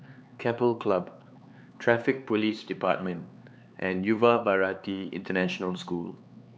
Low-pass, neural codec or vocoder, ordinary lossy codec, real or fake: none; codec, 16 kHz, 4 kbps, X-Codec, HuBERT features, trained on LibriSpeech; none; fake